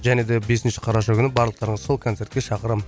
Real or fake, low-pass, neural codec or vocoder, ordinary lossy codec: real; none; none; none